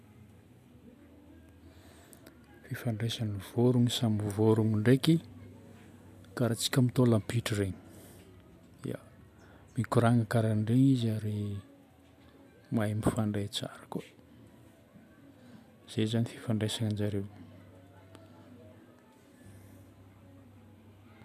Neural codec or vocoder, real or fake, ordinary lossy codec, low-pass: none; real; none; 14.4 kHz